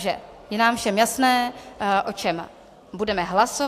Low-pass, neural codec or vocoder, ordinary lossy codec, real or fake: 14.4 kHz; none; AAC, 64 kbps; real